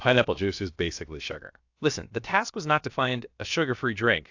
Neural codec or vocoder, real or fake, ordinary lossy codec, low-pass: codec, 16 kHz, about 1 kbps, DyCAST, with the encoder's durations; fake; AAC, 48 kbps; 7.2 kHz